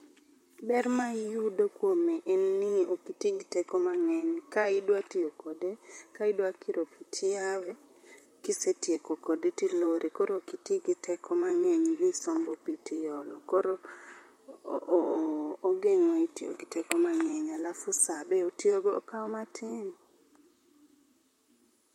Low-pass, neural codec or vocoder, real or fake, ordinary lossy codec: 19.8 kHz; vocoder, 44.1 kHz, 128 mel bands, Pupu-Vocoder; fake; MP3, 64 kbps